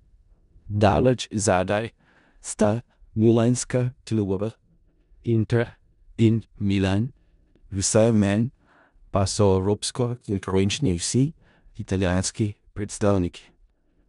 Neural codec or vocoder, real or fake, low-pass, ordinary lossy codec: codec, 16 kHz in and 24 kHz out, 0.4 kbps, LongCat-Audio-Codec, four codebook decoder; fake; 10.8 kHz; none